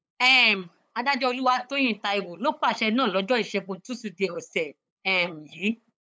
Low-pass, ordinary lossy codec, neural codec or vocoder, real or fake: none; none; codec, 16 kHz, 8 kbps, FunCodec, trained on LibriTTS, 25 frames a second; fake